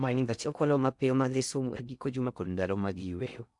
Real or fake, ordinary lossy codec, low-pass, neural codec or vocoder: fake; MP3, 96 kbps; 10.8 kHz; codec, 16 kHz in and 24 kHz out, 0.6 kbps, FocalCodec, streaming, 2048 codes